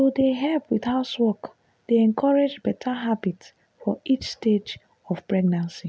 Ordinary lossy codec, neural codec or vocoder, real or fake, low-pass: none; none; real; none